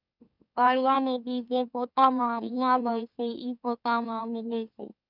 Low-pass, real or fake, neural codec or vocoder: 5.4 kHz; fake; autoencoder, 44.1 kHz, a latent of 192 numbers a frame, MeloTTS